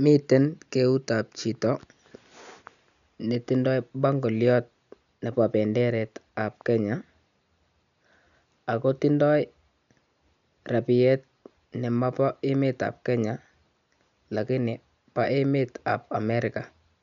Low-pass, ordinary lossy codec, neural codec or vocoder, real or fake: 7.2 kHz; none; none; real